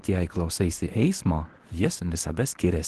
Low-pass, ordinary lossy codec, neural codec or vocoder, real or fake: 10.8 kHz; Opus, 16 kbps; codec, 24 kHz, 0.9 kbps, WavTokenizer, medium speech release version 1; fake